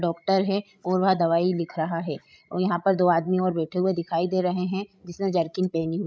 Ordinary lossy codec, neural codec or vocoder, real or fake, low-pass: none; codec, 16 kHz, 16 kbps, FreqCodec, larger model; fake; none